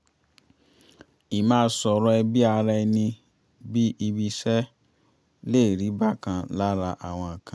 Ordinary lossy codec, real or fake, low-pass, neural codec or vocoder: none; real; none; none